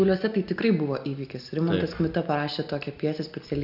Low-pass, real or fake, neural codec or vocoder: 5.4 kHz; real; none